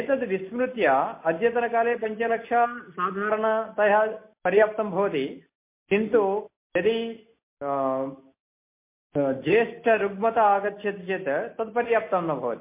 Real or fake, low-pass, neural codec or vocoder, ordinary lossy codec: real; 3.6 kHz; none; MP3, 24 kbps